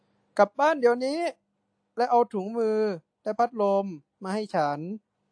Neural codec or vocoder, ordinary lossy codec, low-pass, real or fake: none; AAC, 64 kbps; 9.9 kHz; real